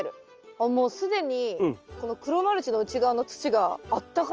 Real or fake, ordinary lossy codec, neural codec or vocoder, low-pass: real; Opus, 24 kbps; none; 7.2 kHz